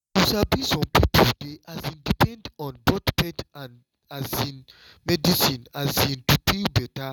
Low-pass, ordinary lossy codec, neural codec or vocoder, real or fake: 19.8 kHz; none; none; real